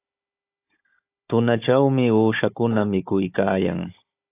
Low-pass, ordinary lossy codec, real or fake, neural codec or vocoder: 3.6 kHz; AAC, 24 kbps; fake; codec, 16 kHz, 16 kbps, FunCodec, trained on Chinese and English, 50 frames a second